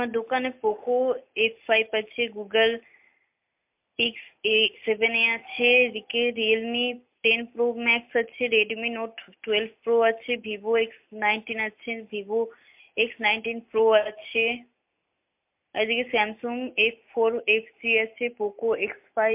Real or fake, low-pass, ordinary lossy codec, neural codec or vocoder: real; 3.6 kHz; MP3, 32 kbps; none